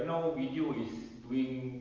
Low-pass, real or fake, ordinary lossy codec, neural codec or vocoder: 7.2 kHz; real; Opus, 24 kbps; none